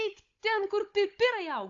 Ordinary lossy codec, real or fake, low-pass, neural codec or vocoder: AAC, 48 kbps; fake; 7.2 kHz; codec, 16 kHz, 16 kbps, FunCodec, trained on Chinese and English, 50 frames a second